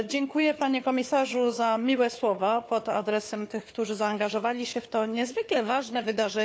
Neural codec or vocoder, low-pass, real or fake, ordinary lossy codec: codec, 16 kHz, 4 kbps, FunCodec, trained on Chinese and English, 50 frames a second; none; fake; none